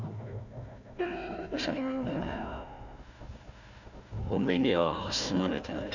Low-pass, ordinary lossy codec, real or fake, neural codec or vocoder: 7.2 kHz; none; fake; codec, 16 kHz, 1 kbps, FunCodec, trained on Chinese and English, 50 frames a second